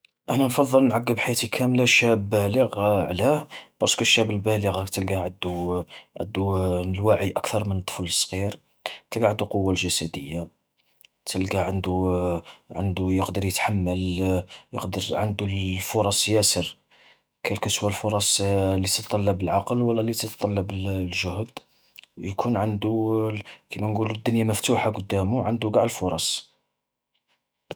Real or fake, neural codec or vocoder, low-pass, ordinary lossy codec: fake; autoencoder, 48 kHz, 128 numbers a frame, DAC-VAE, trained on Japanese speech; none; none